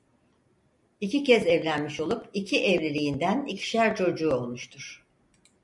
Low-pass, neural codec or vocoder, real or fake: 10.8 kHz; none; real